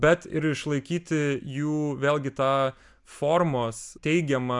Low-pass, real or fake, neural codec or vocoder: 10.8 kHz; real; none